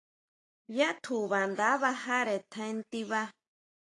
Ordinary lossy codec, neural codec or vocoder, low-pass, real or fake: AAC, 48 kbps; none; 10.8 kHz; real